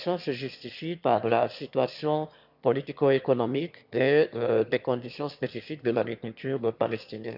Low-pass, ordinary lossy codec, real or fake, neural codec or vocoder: 5.4 kHz; none; fake; autoencoder, 22.05 kHz, a latent of 192 numbers a frame, VITS, trained on one speaker